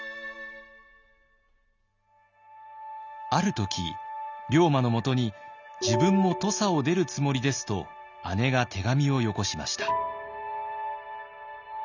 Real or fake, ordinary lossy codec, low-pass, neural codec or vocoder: real; none; 7.2 kHz; none